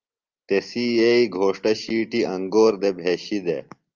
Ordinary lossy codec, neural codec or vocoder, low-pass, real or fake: Opus, 32 kbps; none; 7.2 kHz; real